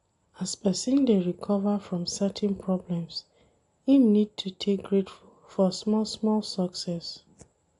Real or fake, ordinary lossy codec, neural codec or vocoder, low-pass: real; AAC, 48 kbps; none; 10.8 kHz